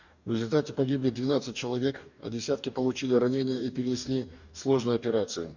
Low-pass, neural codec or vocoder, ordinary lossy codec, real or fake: 7.2 kHz; codec, 44.1 kHz, 2.6 kbps, DAC; none; fake